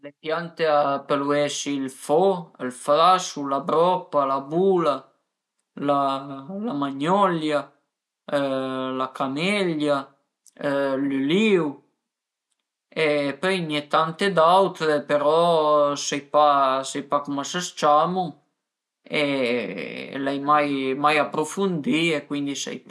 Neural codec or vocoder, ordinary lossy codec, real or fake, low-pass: none; none; real; none